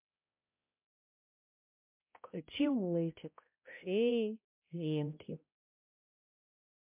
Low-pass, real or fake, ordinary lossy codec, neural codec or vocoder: 3.6 kHz; fake; MP3, 32 kbps; codec, 16 kHz, 0.5 kbps, X-Codec, HuBERT features, trained on balanced general audio